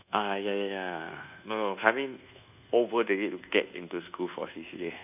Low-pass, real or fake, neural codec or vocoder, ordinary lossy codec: 3.6 kHz; fake; codec, 24 kHz, 1.2 kbps, DualCodec; none